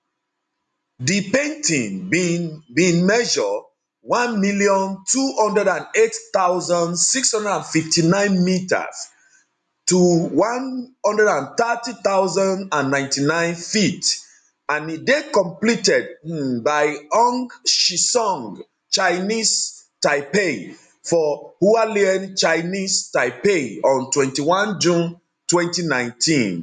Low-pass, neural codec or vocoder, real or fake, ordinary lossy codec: 10.8 kHz; none; real; none